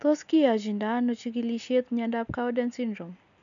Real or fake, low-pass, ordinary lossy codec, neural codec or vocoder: real; 7.2 kHz; none; none